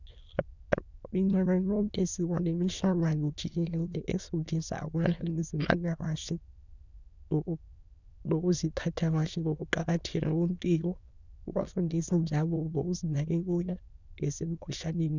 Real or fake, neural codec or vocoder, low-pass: fake; autoencoder, 22.05 kHz, a latent of 192 numbers a frame, VITS, trained on many speakers; 7.2 kHz